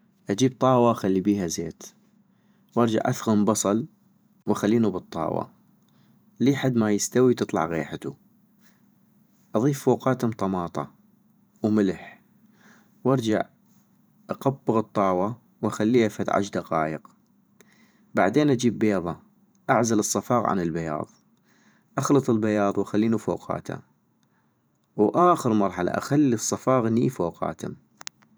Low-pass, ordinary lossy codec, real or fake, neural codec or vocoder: none; none; fake; vocoder, 44.1 kHz, 128 mel bands every 512 samples, BigVGAN v2